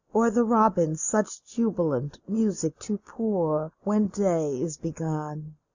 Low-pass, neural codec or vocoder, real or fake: 7.2 kHz; none; real